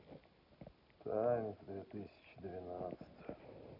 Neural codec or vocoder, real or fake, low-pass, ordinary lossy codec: none; real; 5.4 kHz; none